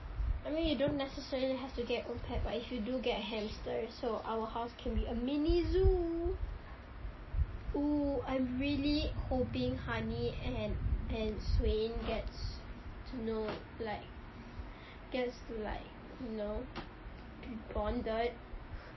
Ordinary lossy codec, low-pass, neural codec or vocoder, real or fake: MP3, 24 kbps; 7.2 kHz; none; real